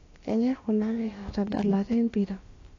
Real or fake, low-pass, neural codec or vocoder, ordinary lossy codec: fake; 7.2 kHz; codec, 16 kHz, about 1 kbps, DyCAST, with the encoder's durations; AAC, 32 kbps